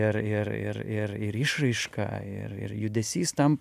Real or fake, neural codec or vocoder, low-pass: real; none; 14.4 kHz